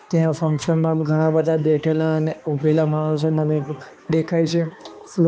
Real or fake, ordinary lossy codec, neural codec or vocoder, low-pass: fake; none; codec, 16 kHz, 2 kbps, X-Codec, HuBERT features, trained on balanced general audio; none